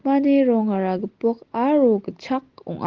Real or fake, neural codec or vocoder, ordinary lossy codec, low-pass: real; none; Opus, 16 kbps; 7.2 kHz